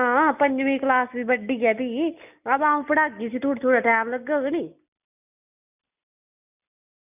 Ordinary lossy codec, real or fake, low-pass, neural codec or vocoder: none; real; 3.6 kHz; none